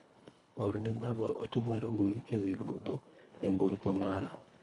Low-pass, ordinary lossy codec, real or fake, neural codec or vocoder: 10.8 kHz; none; fake; codec, 24 kHz, 1.5 kbps, HILCodec